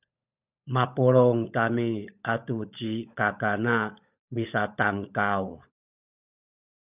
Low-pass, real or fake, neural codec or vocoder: 3.6 kHz; fake; codec, 16 kHz, 16 kbps, FunCodec, trained on LibriTTS, 50 frames a second